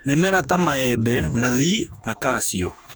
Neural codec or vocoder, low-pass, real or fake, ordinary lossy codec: codec, 44.1 kHz, 2.6 kbps, DAC; none; fake; none